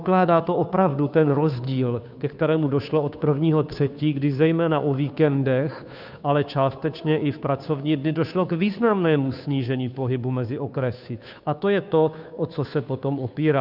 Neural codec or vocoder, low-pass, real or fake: codec, 16 kHz, 2 kbps, FunCodec, trained on Chinese and English, 25 frames a second; 5.4 kHz; fake